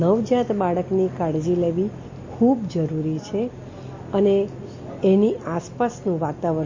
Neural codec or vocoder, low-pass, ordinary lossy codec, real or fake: none; 7.2 kHz; MP3, 32 kbps; real